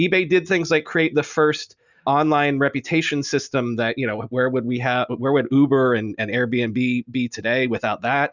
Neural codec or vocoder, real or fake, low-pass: none; real; 7.2 kHz